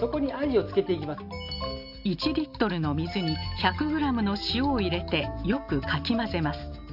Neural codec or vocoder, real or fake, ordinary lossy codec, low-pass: none; real; none; 5.4 kHz